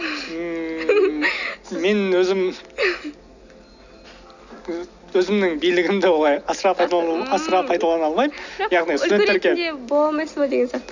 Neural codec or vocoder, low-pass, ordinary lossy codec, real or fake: none; 7.2 kHz; none; real